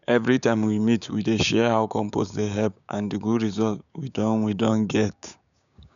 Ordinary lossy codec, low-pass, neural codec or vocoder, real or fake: none; 7.2 kHz; codec, 16 kHz, 6 kbps, DAC; fake